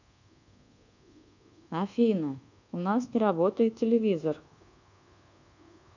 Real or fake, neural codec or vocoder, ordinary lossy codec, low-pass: fake; codec, 24 kHz, 1.2 kbps, DualCodec; none; 7.2 kHz